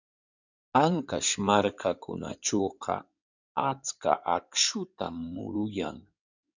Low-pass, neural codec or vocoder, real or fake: 7.2 kHz; codec, 16 kHz in and 24 kHz out, 2.2 kbps, FireRedTTS-2 codec; fake